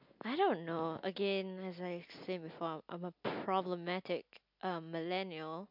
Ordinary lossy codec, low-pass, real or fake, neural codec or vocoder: MP3, 48 kbps; 5.4 kHz; real; none